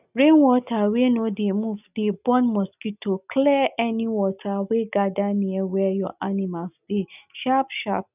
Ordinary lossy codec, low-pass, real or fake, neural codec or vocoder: none; 3.6 kHz; real; none